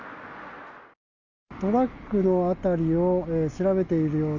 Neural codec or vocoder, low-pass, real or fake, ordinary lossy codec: none; 7.2 kHz; real; none